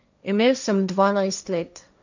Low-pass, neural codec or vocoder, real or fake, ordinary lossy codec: 7.2 kHz; codec, 16 kHz, 1.1 kbps, Voila-Tokenizer; fake; none